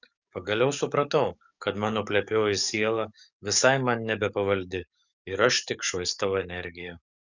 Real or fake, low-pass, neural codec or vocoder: fake; 7.2 kHz; codec, 44.1 kHz, 7.8 kbps, DAC